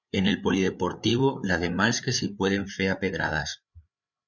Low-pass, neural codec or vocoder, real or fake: 7.2 kHz; codec, 16 kHz, 8 kbps, FreqCodec, larger model; fake